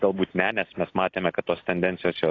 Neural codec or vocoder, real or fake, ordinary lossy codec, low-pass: none; real; AAC, 32 kbps; 7.2 kHz